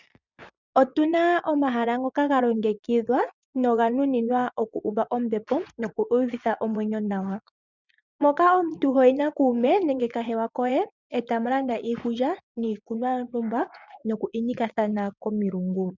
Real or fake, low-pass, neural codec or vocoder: fake; 7.2 kHz; vocoder, 24 kHz, 100 mel bands, Vocos